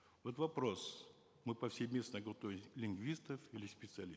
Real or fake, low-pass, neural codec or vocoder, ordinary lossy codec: real; none; none; none